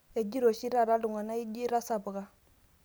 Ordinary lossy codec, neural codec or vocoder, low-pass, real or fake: none; none; none; real